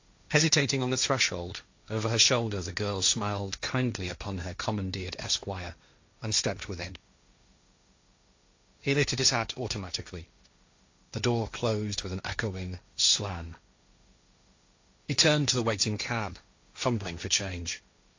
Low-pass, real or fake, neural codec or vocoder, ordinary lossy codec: 7.2 kHz; fake; codec, 16 kHz, 1.1 kbps, Voila-Tokenizer; AAC, 48 kbps